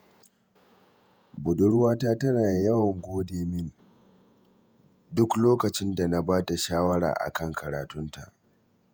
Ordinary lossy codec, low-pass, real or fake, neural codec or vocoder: none; none; fake; vocoder, 48 kHz, 128 mel bands, Vocos